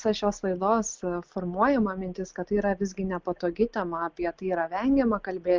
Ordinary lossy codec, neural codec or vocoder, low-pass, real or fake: Opus, 32 kbps; none; 7.2 kHz; real